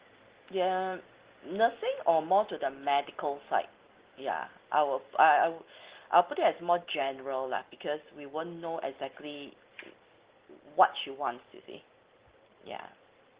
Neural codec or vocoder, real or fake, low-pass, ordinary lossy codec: none; real; 3.6 kHz; Opus, 16 kbps